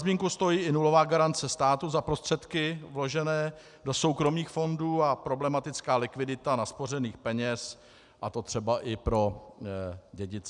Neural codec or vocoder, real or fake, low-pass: none; real; 10.8 kHz